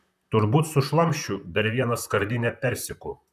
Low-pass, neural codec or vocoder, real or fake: 14.4 kHz; vocoder, 44.1 kHz, 128 mel bands, Pupu-Vocoder; fake